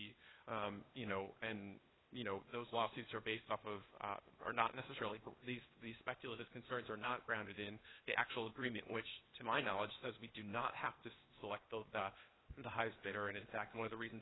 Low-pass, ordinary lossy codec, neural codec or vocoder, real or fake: 7.2 kHz; AAC, 16 kbps; codec, 16 kHz, 0.8 kbps, ZipCodec; fake